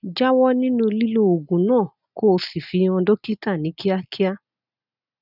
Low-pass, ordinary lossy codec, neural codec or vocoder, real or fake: 5.4 kHz; none; none; real